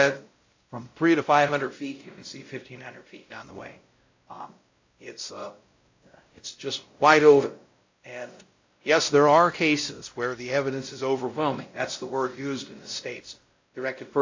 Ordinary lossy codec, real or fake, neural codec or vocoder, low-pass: AAC, 48 kbps; fake; codec, 16 kHz, 0.5 kbps, X-Codec, WavLM features, trained on Multilingual LibriSpeech; 7.2 kHz